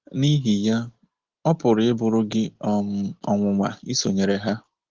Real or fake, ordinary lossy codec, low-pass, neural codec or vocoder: real; Opus, 16 kbps; 7.2 kHz; none